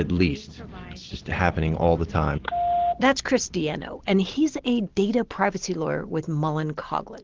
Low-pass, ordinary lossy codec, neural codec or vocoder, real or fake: 7.2 kHz; Opus, 16 kbps; none; real